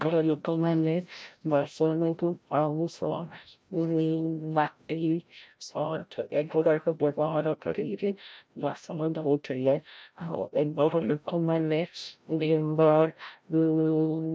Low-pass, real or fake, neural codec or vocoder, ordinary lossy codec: none; fake; codec, 16 kHz, 0.5 kbps, FreqCodec, larger model; none